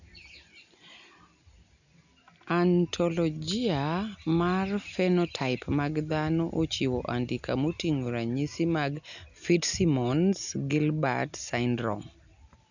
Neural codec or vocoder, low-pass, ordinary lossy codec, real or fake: none; 7.2 kHz; none; real